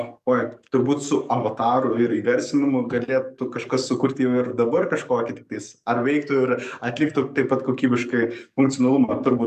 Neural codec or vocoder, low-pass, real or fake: codec, 44.1 kHz, 7.8 kbps, DAC; 14.4 kHz; fake